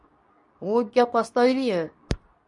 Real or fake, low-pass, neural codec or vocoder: fake; 10.8 kHz; codec, 24 kHz, 0.9 kbps, WavTokenizer, medium speech release version 2